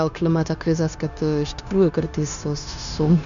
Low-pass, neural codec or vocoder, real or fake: 7.2 kHz; codec, 16 kHz, 0.9 kbps, LongCat-Audio-Codec; fake